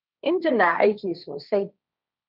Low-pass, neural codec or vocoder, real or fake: 5.4 kHz; codec, 16 kHz, 1.1 kbps, Voila-Tokenizer; fake